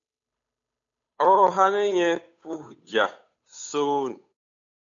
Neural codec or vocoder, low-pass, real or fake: codec, 16 kHz, 8 kbps, FunCodec, trained on Chinese and English, 25 frames a second; 7.2 kHz; fake